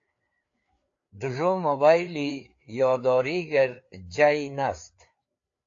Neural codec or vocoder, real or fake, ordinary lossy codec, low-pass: codec, 16 kHz, 4 kbps, FreqCodec, larger model; fake; AAC, 48 kbps; 7.2 kHz